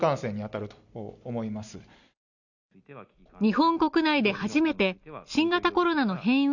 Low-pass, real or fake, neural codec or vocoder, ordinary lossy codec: 7.2 kHz; real; none; none